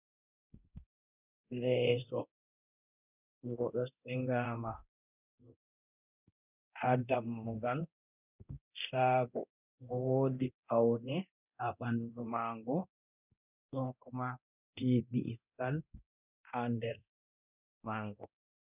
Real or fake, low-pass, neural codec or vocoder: fake; 3.6 kHz; codec, 24 kHz, 0.9 kbps, DualCodec